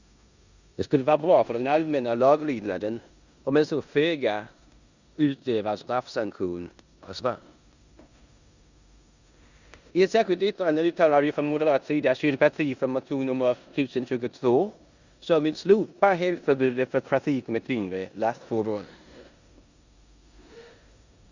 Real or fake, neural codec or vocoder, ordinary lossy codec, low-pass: fake; codec, 16 kHz in and 24 kHz out, 0.9 kbps, LongCat-Audio-Codec, four codebook decoder; Opus, 64 kbps; 7.2 kHz